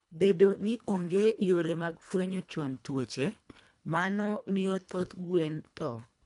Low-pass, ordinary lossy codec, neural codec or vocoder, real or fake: 10.8 kHz; none; codec, 24 kHz, 1.5 kbps, HILCodec; fake